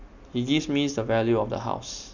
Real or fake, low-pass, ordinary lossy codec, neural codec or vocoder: real; 7.2 kHz; none; none